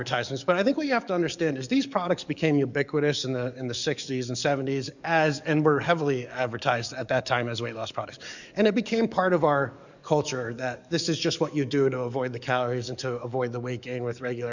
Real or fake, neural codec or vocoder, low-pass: fake; codec, 44.1 kHz, 7.8 kbps, DAC; 7.2 kHz